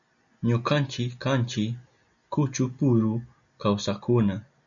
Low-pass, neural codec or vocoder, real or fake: 7.2 kHz; none; real